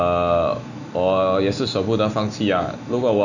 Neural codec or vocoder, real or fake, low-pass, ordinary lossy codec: none; real; 7.2 kHz; none